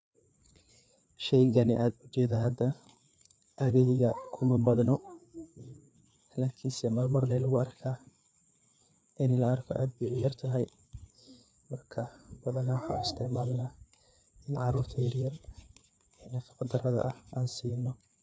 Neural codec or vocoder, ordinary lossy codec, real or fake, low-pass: codec, 16 kHz, 4 kbps, FreqCodec, larger model; none; fake; none